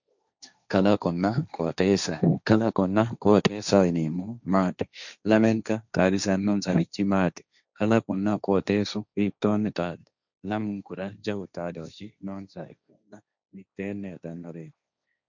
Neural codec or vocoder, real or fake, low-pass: codec, 16 kHz, 1.1 kbps, Voila-Tokenizer; fake; 7.2 kHz